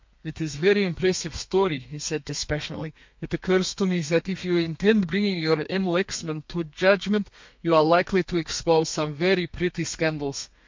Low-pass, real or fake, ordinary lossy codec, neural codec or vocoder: 7.2 kHz; fake; MP3, 48 kbps; codec, 32 kHz, 1.9 kbps, SNAC